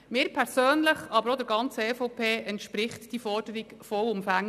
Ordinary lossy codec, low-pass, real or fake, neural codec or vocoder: none; 14.4 kHz; real; none